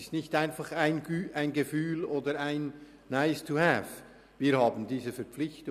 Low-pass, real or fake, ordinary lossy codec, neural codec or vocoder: 14.4 kHz; real; none; none